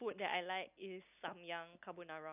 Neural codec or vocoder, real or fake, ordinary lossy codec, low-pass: none; real; none; 3.6 kHz